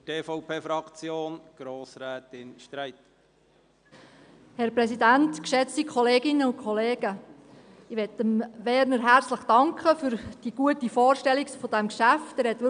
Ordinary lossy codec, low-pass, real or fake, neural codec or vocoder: none; 9.9 kHz; real; none